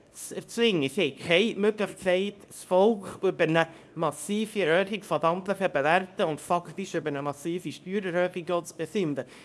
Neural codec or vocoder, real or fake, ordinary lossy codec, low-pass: codec, 24 kHz, 0.9 kbps, WavTokenizer, small release; fake; none; none